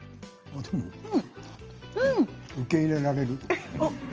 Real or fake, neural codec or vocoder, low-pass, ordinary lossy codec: real; none; 7.2 kHz; Opus, 24 kbps